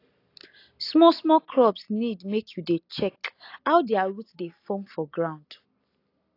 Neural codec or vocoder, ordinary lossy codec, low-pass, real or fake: none; AAC, 32 kbps; 5.4 kHz; real